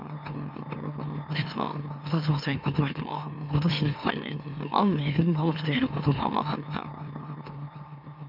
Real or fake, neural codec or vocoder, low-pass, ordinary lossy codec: fake; autoencoder, 44.1 kHz, a latent of 192 numbers a frame, MeloTTS; 5.4 kHz; none